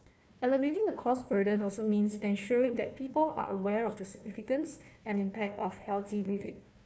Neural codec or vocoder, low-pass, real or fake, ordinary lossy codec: codec, 16 kHz, 1 kbps, FunCodec, trained on Chinese and English, 50 frames a second; none; fake; none